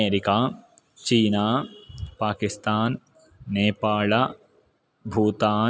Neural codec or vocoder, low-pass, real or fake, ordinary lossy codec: none; none; real; none